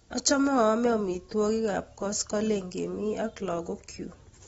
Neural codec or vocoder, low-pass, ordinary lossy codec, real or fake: none; 19.8 kHz; AAC, 24 kbps; real